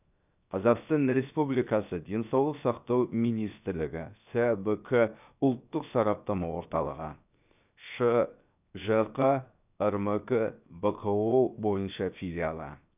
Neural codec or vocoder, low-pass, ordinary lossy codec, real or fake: codec, 16 kHz, 0.7 kbps, FocalCodec; 3.6 kHz; none; fake